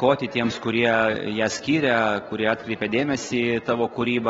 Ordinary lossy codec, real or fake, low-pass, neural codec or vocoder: AAC, 24 kbps; real; 7.2 kHz; none